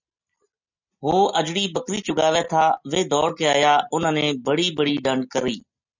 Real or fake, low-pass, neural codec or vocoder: real; 7.2 kHz; none